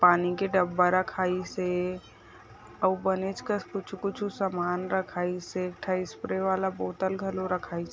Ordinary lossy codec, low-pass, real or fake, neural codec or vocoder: none; none; real; none